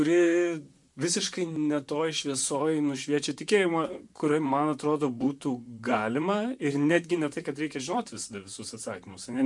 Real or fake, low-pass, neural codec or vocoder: fake; 10.8 kHz; vocoder, 44.1 kHz, 128 mel bands, Pupu-Vocoder